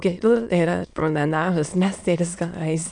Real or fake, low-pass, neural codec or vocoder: fake; 9.9 kHz; autoencoder, 22.05 kHz, a latent of 192 numbers a frame, VITS, trained on many speakers